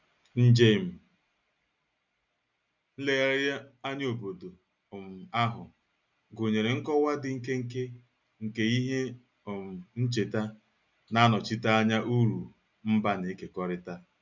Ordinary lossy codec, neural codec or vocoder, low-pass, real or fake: none; none; 7.2 kHz; real